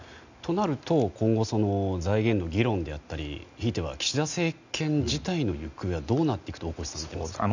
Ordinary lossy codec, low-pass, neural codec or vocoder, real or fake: none; 7.2 kHz; none; real